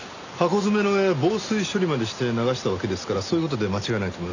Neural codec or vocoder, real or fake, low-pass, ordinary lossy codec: none; real; 7.2 kHz; none